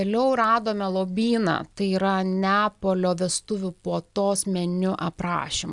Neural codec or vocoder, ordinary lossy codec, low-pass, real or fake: none; Opus, 64 kbps; 10.8 kHz; real